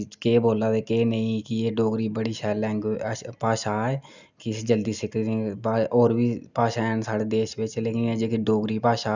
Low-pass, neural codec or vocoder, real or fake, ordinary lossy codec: 7.2 kHz; none; real; none